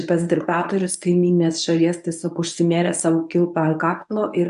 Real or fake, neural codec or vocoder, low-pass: fake; codec, 24 kHz, 0.9 kbps, WavTokenizer, medium speech release version 1; 10.8 kHz